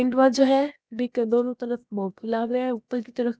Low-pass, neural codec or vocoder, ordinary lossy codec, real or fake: none; codec, 16 kHz, 0.7 kbps, FocalCodec; none; fake